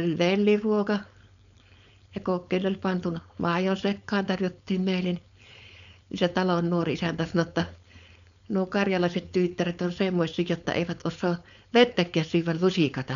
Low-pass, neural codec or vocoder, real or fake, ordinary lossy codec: 7.2 kHz; codec, 16 kHz, 4.8 kbps, FACodec; fake; none